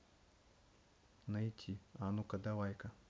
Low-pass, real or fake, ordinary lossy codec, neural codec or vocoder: none; real; none; none